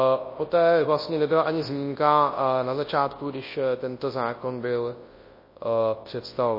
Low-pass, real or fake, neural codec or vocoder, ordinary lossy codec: 5.4 kHz; fake; codec, 24 kHz, 0.9 kbps, WavTokenizer, large speech release; MP3, 24 kbps